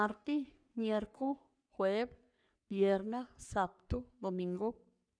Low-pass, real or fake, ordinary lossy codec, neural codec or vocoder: 9.9 kHz; fake; none; codec, 44.1 kHz, 3.4 kbps, Pupu-Codec